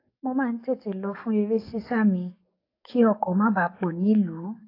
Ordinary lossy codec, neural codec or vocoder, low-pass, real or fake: AAC, 32 kbps; codec, 44.1 kHz, 7.8 kbps, DAC; 5.4 kHz; fake